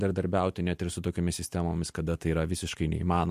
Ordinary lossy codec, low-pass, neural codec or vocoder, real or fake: MP3, 64 kbps; 14.4 kHz; none; real